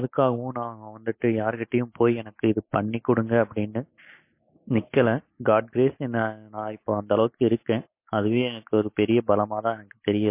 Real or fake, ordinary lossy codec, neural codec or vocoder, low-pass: real; MP3, 24 kbps; none; 3.6 kHz